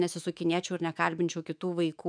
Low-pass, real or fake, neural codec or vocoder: 9.9 kHz; fake; autoencoder, 48 kHz, 128 numbers a frame, DAC-VAE, trained on Japanese speech